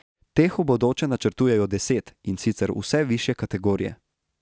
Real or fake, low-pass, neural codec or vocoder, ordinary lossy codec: real; none; none; none